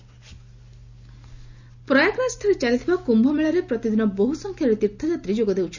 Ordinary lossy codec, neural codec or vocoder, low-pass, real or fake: none; none; 7.2 kHz; real